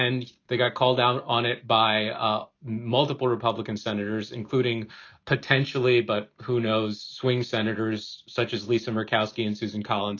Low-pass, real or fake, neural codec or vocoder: 7.2 kHz; real; none